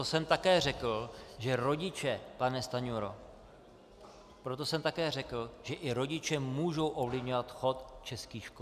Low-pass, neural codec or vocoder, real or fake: 14.4 kHz; none; real